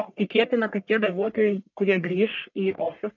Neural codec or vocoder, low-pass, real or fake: codec, 44.1 kHz, 1.7 kbps, Pupu-Codec; 7.2 kHz; fake